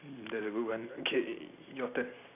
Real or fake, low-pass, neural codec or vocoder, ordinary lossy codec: real; 3.6 kHz; none; none